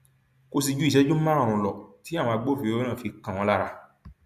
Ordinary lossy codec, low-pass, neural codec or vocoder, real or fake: none; 14.4 kHz; none; real